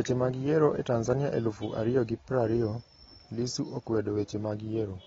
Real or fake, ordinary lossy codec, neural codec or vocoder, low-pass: real; AAC, 24 kbps; none; 7.2 kHz